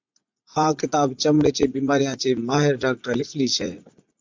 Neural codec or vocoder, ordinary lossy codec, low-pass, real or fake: vocoder, 44.1 kHz, 80 mel bands, Vocos; MP3, 64 kbps; 7.2 kHz; fake